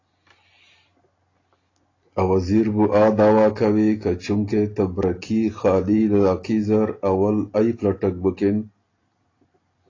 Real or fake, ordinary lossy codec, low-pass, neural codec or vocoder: real; AAC, 32 kbps; 7.2 kHz; none